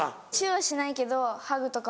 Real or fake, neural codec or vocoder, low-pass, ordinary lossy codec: real; none; none; none